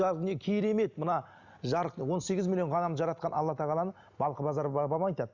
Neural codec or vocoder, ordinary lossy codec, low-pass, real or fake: none; Opus, 64 kbps; 7.2 kHz; real